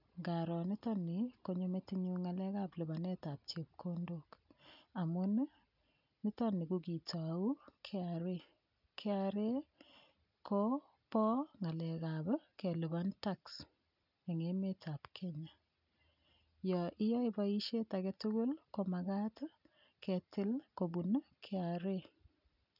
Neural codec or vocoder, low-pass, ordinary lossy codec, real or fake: none; 5.4 kHz; none; real